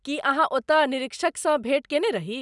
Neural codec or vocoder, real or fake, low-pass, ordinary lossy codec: none; real; 10.8 kHz; none